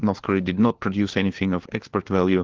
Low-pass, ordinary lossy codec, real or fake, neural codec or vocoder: 7.2 kHz; Opus, 16 kbps; fake; codec, 16 kHz, 4 kbps, FunCodec, trained on LibriTTS, 50 frames a second